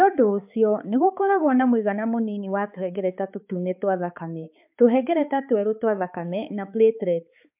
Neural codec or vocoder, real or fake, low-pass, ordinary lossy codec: codec, 16 kHz, 4 kbps, X-Codec, HuBERT features, trained on balanced general audio; fake; 3.6 kHz; MP3, 32 kbps